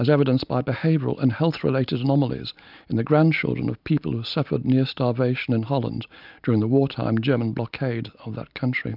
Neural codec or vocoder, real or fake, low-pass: none; real; 5.4 kHz